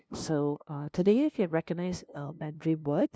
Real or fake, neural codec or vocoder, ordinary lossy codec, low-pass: fake; codec, 16 kHz, 0.5 kbps, FunCodec, trained on LibriTTS, 25 frames a second; none; none